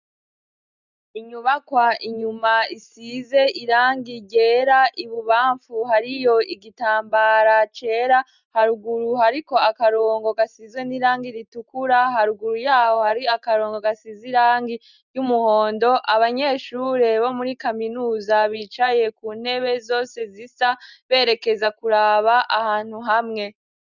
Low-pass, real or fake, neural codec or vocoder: 7.2 kHz; real; none